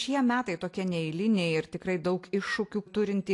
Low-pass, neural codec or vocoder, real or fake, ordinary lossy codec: 10.8 kHz; none; real; AAC, 48 kbps